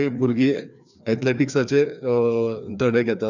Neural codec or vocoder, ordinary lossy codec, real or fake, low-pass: codec, 16 kHz, 2 kbps, FreqCodec, larger model; none; fake; 7.2 kHz